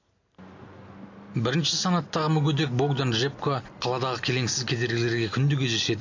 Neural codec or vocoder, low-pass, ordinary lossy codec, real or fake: none; 7.2 kHz; AAC, 48 kbps; real